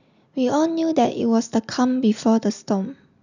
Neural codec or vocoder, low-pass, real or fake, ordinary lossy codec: none; 7.2 kHz; real; none